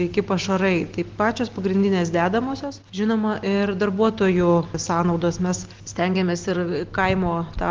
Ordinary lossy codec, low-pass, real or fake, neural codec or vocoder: Opus, 16 kbps; 7.2 kHz; real; none